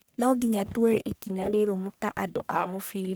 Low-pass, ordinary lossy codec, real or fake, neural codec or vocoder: none; none; fake; codec, 44.1 kHz, 1.7 kbps, Pupu-Codec